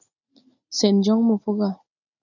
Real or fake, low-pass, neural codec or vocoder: real; 7.2 kHz; none